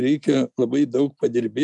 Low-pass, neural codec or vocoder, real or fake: 10.8 kHz; none; real